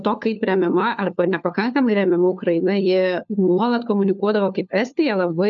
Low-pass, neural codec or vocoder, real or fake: 7.2 kHz; codec, 16 kHz, 4 kbps, FunCodec, trained on LibriTTS, 50 frames a second; fake